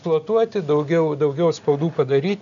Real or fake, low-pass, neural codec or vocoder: real; 7.2 kHz; none